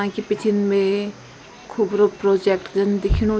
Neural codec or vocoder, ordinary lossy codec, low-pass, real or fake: none; none; none; real